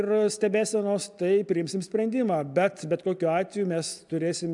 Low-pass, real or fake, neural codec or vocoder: 10.8 kHz; real; none